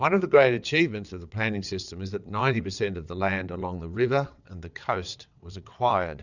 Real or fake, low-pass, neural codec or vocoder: fake; 7.2 kHz; vocoder, 22.05 kHz, 80 mel bands, WaveNeXt